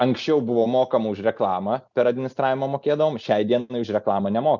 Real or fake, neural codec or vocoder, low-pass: real; none; 7.2 kHz